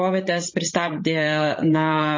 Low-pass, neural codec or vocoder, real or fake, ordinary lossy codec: 7.2 kHz; codec, 16 kHz, 16 kbps, FreqCodec, larger model; fake; MP3, 32 kbps